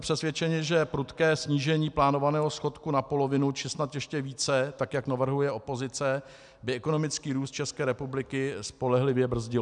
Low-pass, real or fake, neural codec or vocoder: 10.8 kHz; real; none